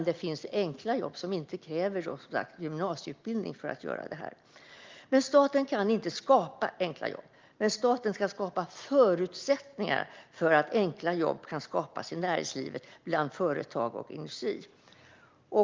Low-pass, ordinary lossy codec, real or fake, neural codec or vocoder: 7.2 kHz; Opus, 32 kbps; real; none